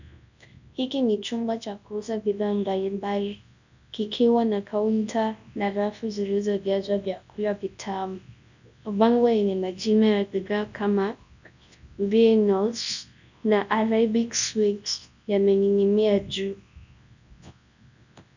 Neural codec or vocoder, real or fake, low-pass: codec, 24 kHz, 0.9 kbps, WavTokenizer, large speech release; fake; 7.2 kHz